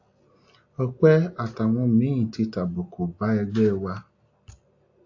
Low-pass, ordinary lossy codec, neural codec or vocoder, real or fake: 7.2 kHz; AAC, 48 kbps; none; real